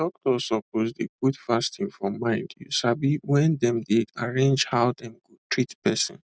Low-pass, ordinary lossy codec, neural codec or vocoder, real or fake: none; none; none; real